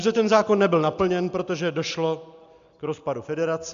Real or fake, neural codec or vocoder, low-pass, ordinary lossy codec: real; none; 7.2 kHz; MP3, 64 kbps